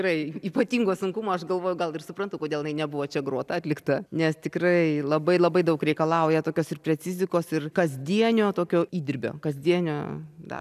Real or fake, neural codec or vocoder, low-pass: real; none; 14.4 kHz